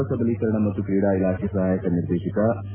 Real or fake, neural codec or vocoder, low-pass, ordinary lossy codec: real; none; 3.6 kHz; AAC, 24 kbps